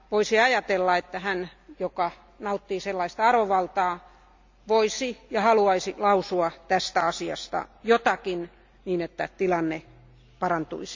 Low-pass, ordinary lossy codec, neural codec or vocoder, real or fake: 7.2 kHz; MP3, 64 kbps; none; real